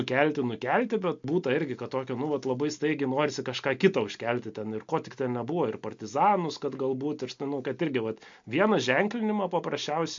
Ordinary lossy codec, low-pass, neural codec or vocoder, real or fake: MP3, 48 kbps; 7.2 kHz; none; real